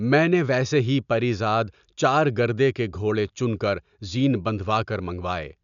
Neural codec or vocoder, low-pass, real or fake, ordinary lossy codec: none; 7.2 kHz; real; none